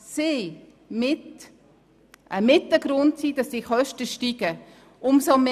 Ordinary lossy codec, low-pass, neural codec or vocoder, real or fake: none; 14.4 kHz; none; real